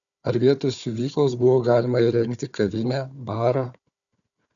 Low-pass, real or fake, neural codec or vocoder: 7.2 kHz; fake; codec, 16 kHz, 4 kbps, FunCodec, trained on Chinese and English, 50 frames a second